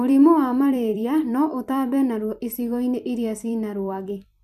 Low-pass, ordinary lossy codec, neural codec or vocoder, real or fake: 14.4 kHz; none; vocoder, 44.1 kHz, 128 mel bands every 256 samples, BigVGAN v2; fake